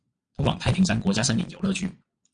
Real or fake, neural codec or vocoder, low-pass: fake; vocoder, 22.05 kHz, 80 mel bands, Vocos; 9.9 kHz